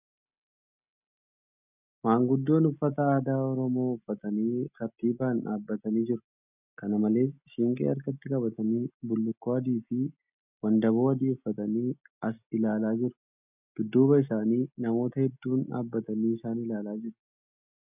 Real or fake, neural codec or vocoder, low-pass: real; none; 3.6 kHz